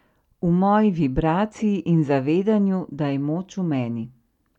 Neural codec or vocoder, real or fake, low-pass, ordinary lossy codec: none; real; 19.8 kHz; none